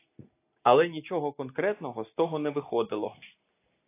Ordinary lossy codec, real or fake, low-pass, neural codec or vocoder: AAC, 24 kbps; real; 3.6 kHz; none